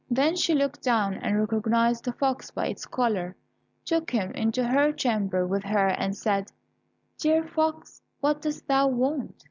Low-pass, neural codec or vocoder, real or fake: 7.2 kHz; none; real